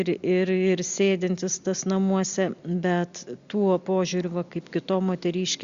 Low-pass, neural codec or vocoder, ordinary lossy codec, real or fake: 7.2 kHz; none; Opus, 64 kbps; real